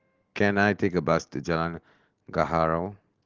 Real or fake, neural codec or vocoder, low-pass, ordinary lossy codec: real; none; 7.2 kHz; Opus, 16 kbps